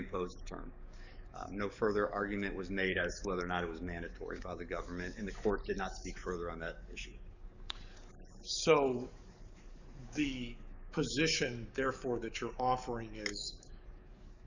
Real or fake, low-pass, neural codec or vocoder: fake; 7.2 kHz; codec, 44.1 kHz, 7.8 kbps, DAC